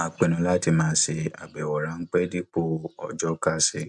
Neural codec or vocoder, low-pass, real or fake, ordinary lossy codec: none; 10.8 kHz; real; none